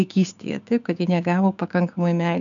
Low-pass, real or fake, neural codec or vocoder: 7.2 kHz; fake; codec, 16 kHz, 6 kbps, DAC